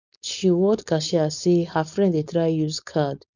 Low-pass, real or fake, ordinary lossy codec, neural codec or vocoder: 7.2 kHz; fake; none; codec, 16 kHz, 4.8 kbps, FACodec